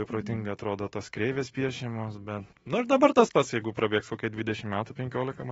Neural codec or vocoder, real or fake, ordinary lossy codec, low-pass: autoencoder, 48 kHz, 128 numbers a frame, DAC-VAE, trained on Japanese speech; fake; AAC, 24 kbps; 19.8 kHz